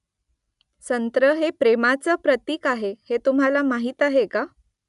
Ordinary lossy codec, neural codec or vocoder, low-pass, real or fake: none; none; 10.8 kHz; real